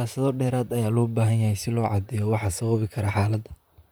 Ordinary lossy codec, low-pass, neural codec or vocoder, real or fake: none; none; vocoder, 44.1 kHz, 128 mel bands, Pupu-Vocoder; fake